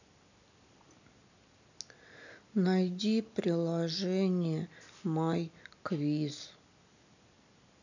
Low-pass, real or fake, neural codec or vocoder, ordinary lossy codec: 7.2 kHz; real; none; none